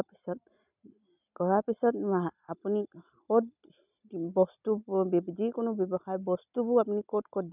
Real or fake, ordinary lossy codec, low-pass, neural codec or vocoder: real; none; 3.6 kHz; none